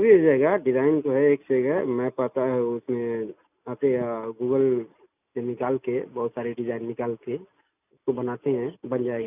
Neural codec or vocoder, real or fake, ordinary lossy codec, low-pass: none; real; none; 3.6 kHz